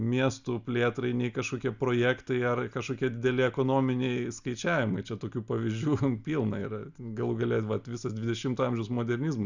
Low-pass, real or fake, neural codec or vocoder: 7.2 kHz; real; none